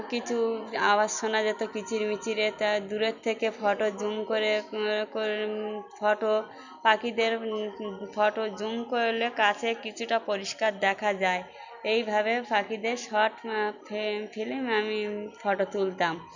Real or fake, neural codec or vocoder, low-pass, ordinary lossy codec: real; none; 7.2 kHz; none